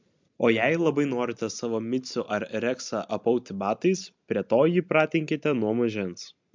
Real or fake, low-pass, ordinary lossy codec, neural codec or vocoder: real; 7.2 kHz; MP3, 64 kbps; none